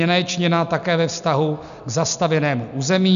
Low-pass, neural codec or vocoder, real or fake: 7.2 kHz; none; real